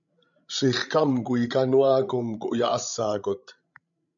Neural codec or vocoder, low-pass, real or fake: codec, 16 kHz, 16 kbps, FreqCodec, larger model; 7.2 kHz; fake